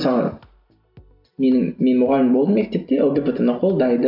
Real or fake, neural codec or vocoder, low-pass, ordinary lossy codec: real; none; 5.4 kHz; none